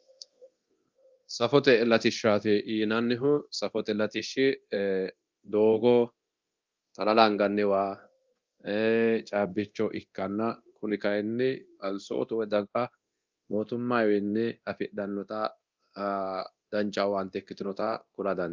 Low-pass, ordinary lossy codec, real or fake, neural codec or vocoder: 7.2 kHz; Opus, 24 kbps; fake; codec, 24 kHz, 0.9 kbps, DualCodec